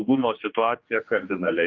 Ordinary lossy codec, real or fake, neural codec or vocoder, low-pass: Opus, 32 kbps; fake; codec, 16 kHz, 2 kbps, X-Codec, HuBERT features, trained on general audio; 7.2 kHz